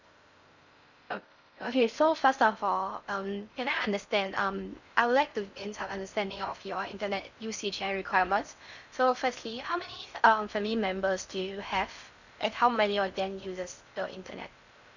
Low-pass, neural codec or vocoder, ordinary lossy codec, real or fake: 7.2 kHz; codec, 16 kHz in and 24 kHz out, 0.6 kbps, FocalCodec, streaming, 4096 codes; none; fake